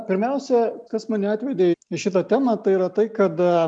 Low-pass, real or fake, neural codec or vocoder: 9.9 kHz; real; none